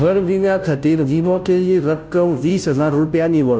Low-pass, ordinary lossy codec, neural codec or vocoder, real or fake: none; none; codec, 16 kHz, 0.5 kbps, FunCodec, trained on Chinese and English, 25 frames a second; fake